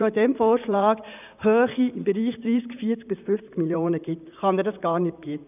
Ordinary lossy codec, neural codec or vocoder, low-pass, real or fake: none; vocoder, 44.1 kHz, 128 mel bands, Pupu-Vocoder; 3.6 kHz; fake